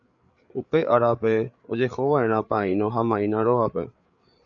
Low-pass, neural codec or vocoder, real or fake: 7.2 kHz; codec, 16 kHz, 8 kbps, FreqCodec, larger model; fake